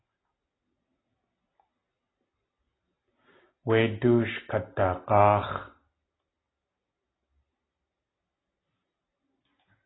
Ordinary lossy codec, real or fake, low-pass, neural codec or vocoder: AAC, 16 kbps; real; 7.2 kHz; none